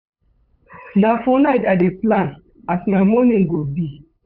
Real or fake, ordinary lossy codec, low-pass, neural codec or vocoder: fake; none; 5.4 kHz; codec, 16 kHz, 8 kbps, FunCodec, trained on LibriTTS, 25 frames a second